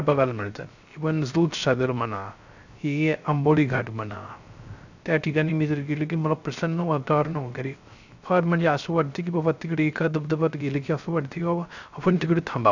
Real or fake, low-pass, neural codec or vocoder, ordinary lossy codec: fake; 7.2 kHz; codec, 16 kHz, 0.3 kbps, FocalCodec; none